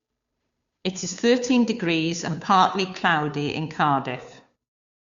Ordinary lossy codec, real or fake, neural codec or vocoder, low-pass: Opus, 64 kbps; fake; codec, 16 kHz, 2 kbps, FunCodec, trained on Chinese and English, 25 frames a second; 7.2 kHz